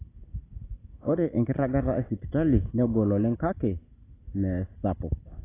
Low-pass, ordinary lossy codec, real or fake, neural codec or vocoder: 3.6 kHz; AAC, 16 kbps; real; none